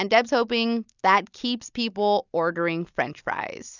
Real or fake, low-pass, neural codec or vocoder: real; 7.2 kHz; none